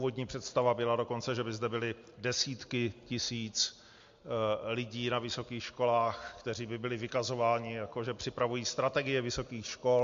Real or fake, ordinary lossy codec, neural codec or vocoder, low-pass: real; MP3, 48 kbps; none; 7.2 kHz